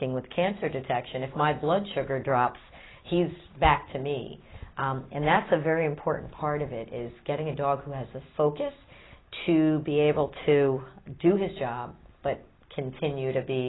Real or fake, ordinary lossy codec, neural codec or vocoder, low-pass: real; AAC, 16 kbps; none; 7.2 kHz